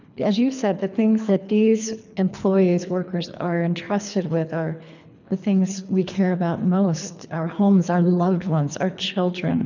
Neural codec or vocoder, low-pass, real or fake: codec, 24 kHz, 3 kbps, HILCodec; 7.2 kHz; fake